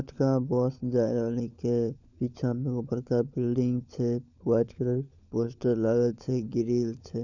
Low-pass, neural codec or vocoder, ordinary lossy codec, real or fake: 7.2 kHz; codec, 16 kHz, 16 kbps, FunCodec, trained on LibriTTS, 50 frames a second; none; fake